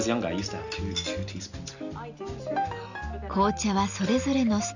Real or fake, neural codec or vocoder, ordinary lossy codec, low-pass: real; none; none; 7.2 kHz